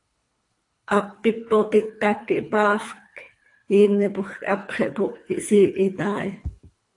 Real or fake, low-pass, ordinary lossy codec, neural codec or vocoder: fake; 10.8 kHz; AAC, 48 kbps; codec, 24 kHz, 3 kbps, HILCodec